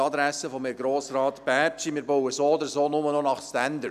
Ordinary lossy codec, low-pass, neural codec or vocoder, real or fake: none; 14.4 kHz; none; real